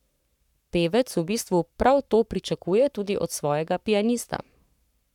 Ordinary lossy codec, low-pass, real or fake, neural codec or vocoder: none; 19.8 kHz; fake; codec, 44.1 kHz, 7.8 kbps, Pupu-Codec